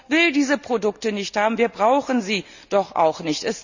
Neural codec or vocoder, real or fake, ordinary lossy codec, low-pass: none; real; none; 7.2 kHz